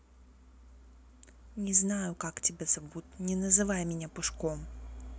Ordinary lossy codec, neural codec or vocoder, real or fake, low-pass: none; none; real; none